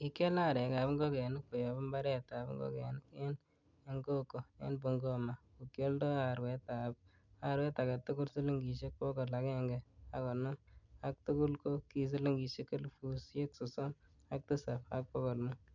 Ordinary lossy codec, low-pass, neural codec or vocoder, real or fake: none; 7.2 kHz; none; real